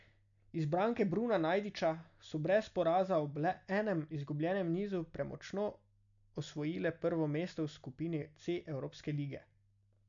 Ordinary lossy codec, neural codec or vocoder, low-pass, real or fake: MP3, 96 kbps; none; 7.2 kHz; real